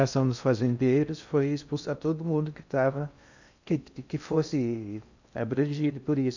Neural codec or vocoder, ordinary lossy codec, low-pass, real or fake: codec, 16 kHz in and 24 kHz out, 0.6 kbps, FocalCodec, streaming, 2048 codes; none; 7.2 kHz; fake